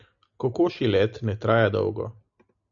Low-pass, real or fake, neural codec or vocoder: 7.2 kHz; real; none